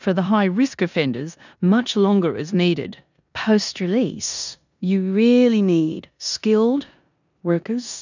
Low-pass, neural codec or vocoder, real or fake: 7.2 kHz; codec, 16 kHz in and 24 kHz out, 0.9 kbps, LongCat-Audio-Codec, four codebook decoder; fake